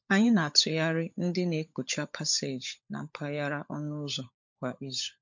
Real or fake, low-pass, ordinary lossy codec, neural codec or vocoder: fake; 7.2 kHz; MP3, 48 kbps; codec, 16 kHz, 16 kbps, FunCodec, trained on LibriTTS, 50 frames a second